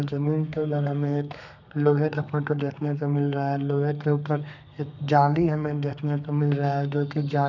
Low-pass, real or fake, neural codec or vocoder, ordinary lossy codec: 7.2 kHz; fake; codec, 32 kHz, 1.9 kbps, SNAC; none